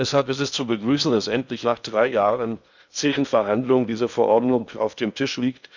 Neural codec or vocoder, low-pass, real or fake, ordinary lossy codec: codec, 16 kHz in and 24 kHz out, 0.8 kbps, FocalCodec, streaming, 65536 codes; 7.2 kHz; fake; none